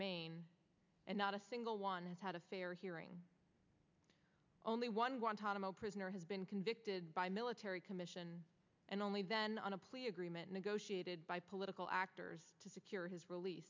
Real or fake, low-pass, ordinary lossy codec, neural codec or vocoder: real; 7.2 kHz; MP3, 64 kbps; none